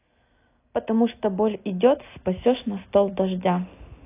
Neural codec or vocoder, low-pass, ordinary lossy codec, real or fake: none; 3.6 kHz; AAC, 24 kbps; real